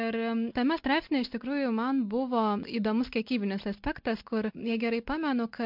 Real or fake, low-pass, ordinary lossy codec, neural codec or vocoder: real; 5.4 kHz; MP3, 48 kbps; none